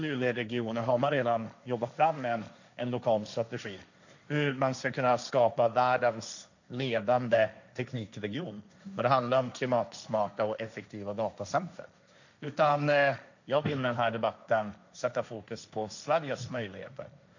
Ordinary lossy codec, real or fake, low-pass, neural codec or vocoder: none; fake; 7.2 kHz; codec, 16 kHz, 1.1 kbps, Voila-Tokenizer